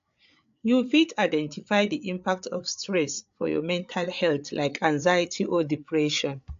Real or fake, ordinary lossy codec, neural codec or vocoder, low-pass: fake; AAC, 64 kbps; codec, 16 kHz, 8 kbps, FreqCodec, larger model; 7.2 kHz